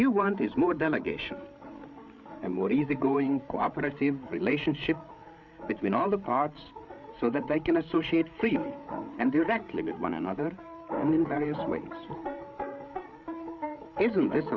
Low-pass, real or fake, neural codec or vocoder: 7.2 kHz; fake; codec, 16 kHz, 8 kbps, FreqCodec, larger model